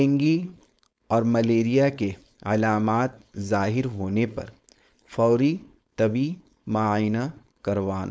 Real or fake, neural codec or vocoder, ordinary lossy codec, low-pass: fake; codec, 16 kHz, 4.8 kbps, FACodec; none; none